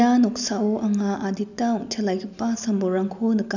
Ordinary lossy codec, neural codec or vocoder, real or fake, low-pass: none; none; real; 7.2 kHz